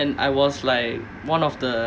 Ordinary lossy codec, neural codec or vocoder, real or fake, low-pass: none; none; real; none